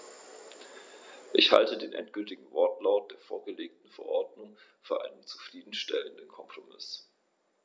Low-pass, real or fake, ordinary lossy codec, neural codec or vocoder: none; real; none; none